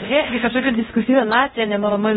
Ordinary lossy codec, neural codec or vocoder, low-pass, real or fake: AAC, 16 kbps; codec, 16 kHz, 0.5 kbps, X-Codec, HuBERT features, trained on LibriSpeech; 7.2 kHz; fake